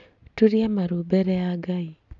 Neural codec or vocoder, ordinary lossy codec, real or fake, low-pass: none; none; real; 7.2 kHz